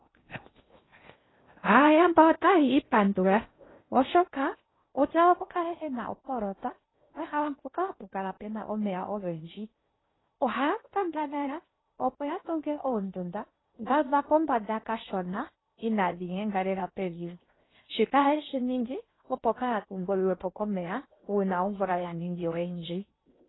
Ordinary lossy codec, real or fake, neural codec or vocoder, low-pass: AAC, 16 kbps; fake; codec, 16 kHz in and 24 kHz out, 0.6 kbps, FocalCodec, streaming, 2048 codes; 7.2 kHz